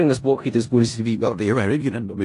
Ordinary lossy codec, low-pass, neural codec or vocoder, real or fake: AAC, 48 kbps; 10.8 kHz; codec, 16 kHz in and 24 kHz out, 0.4 kbps, LongCat-Audio-Codec, four codebook decoder; fake